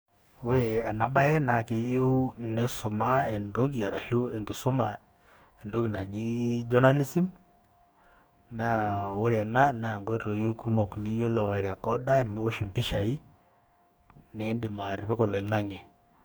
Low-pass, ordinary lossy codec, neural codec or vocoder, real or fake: none; none; codec, 44.1 kHz, 2.6 kbps, DAC; fake